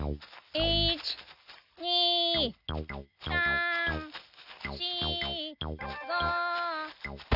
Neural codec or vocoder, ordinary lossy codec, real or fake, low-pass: none; none; real; 5.4 kHz